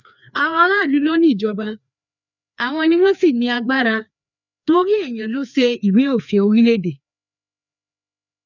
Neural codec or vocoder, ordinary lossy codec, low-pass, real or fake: codec, 16 kHz, 2 kbps, FreqCodec, larger model; none; 7.2 kHz; fake